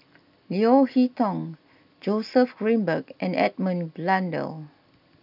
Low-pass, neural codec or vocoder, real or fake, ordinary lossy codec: 5.4 kHz; none; real; none